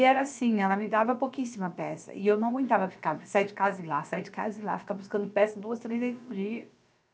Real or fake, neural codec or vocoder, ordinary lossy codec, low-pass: fake; codec, 16 kHz, about 1 kbps, DyCAST, with the encoder's durations; none; none